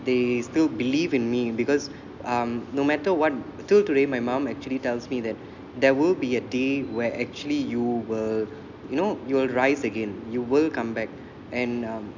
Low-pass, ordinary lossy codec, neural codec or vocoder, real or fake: 7.2 kHz; none; none; real